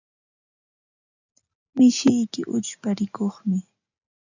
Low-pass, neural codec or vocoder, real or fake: 7.2 kHz; none; real